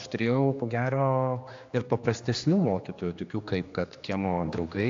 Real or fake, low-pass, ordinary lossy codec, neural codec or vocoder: fake; 7.2 kHz; AAC, 48 kbps; codec, 16 kHz, 2 kbps, X-Codec, HuBERT features, trained on general audio